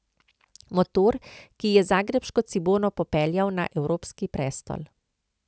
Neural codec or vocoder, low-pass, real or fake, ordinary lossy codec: none; none; real; none